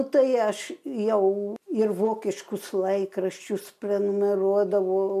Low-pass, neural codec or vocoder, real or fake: 14.4 kHz; none; real